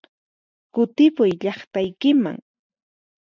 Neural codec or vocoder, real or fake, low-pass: none; real; 7.2 kHz